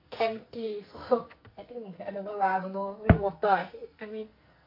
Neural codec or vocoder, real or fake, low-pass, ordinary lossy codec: codec, 44.1 kHz, 2.6 kbps, SNAC; fake; 5.4 kHz; MP3, 32 kbps